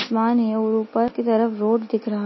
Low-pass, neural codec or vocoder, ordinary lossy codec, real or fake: 7.2 kHz; none; MP3, 24 kbps; real